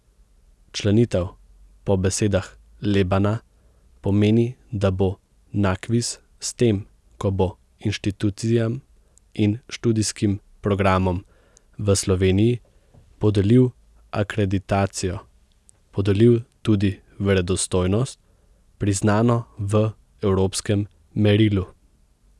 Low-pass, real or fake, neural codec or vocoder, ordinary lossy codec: none; real; none; none